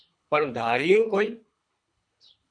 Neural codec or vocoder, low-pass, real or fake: codec, 24 kHz, 3 kbps, HILCodec; 9.9 kHz; fake